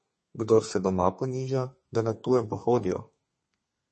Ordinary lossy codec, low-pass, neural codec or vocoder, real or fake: MP3, 32 kbps; 10.8 kHz; codec, 32 kHz, 1.9 kbps, SNAC; fake